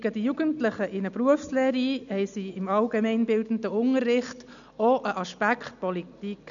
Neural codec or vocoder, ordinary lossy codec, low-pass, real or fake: none; none; 7.2 kHz; real